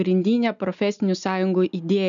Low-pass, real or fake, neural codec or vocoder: 7.2 kHz; real; none